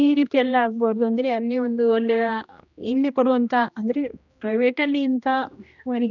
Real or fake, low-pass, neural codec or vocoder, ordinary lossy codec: fake; 7.2 kHz; codec, 16 kHz, 1 kbps, X-Codec, HuBERT features, trained on general audio; none